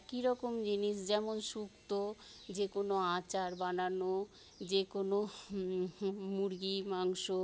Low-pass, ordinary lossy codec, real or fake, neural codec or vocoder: none; none; real; none